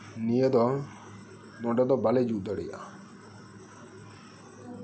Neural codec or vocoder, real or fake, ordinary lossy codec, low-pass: none; real; none; none